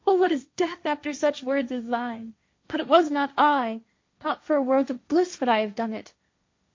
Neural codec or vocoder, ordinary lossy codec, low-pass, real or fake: codec, 16 kHz, 1.1 kbps, Voila-Tokenizer; MP3, 48 kbps; 7.2 kHz; fake